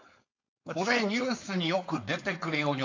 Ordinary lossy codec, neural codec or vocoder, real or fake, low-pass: AAC, 48 kbps; codec, 16 kHz, 4.8 kbps, FACodec; fake; 7.2 kHz